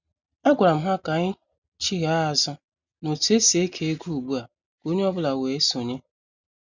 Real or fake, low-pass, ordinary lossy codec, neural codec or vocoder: real; 7.2 kHz; none; none